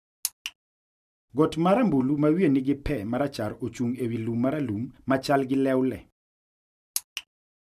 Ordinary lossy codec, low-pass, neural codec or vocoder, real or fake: none; 14.4 kHz; none; real